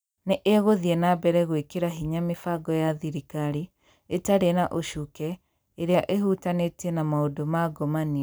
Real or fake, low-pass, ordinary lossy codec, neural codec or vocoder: real; none; none; none